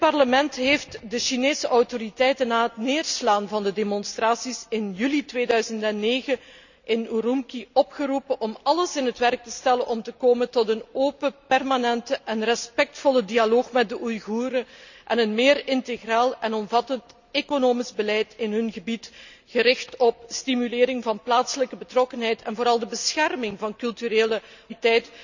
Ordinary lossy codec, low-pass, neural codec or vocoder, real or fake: none; 7.2 kHz; none; real